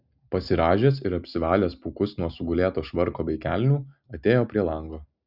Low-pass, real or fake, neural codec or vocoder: 5.4 kHz; real; none